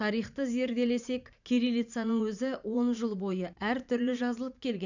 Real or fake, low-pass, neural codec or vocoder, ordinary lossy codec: fake; 7.2 kHz; vocoder, 44.1 kHz, 80 mel bands, Vocos; none